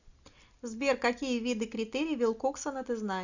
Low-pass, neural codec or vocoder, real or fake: 7.2 kHz; none; real